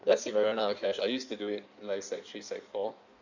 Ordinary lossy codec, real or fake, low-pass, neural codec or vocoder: none; fake; 7.2 kHz; codec, 16 kHz in and 24 kHz out, 1.1 kbps, FireRedTTS-2 codec